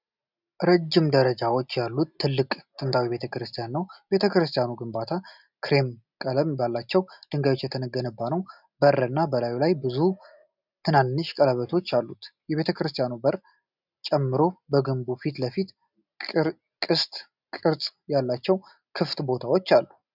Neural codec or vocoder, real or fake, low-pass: none; real; 5.4 kHz